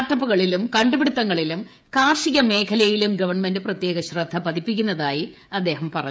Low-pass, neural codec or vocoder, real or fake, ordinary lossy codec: none; codec, 16 kHz, 16 kbps, FreqCodec, smaller model; fake; none